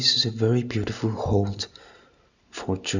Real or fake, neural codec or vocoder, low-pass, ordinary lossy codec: real; none; 7.2 kHz; none